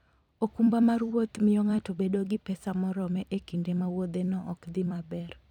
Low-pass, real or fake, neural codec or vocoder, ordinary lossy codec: 19.8 kHz; fake; vocoder, 44.1 kHz, 128 mel bands every 256 samples, BigVGAN v2; none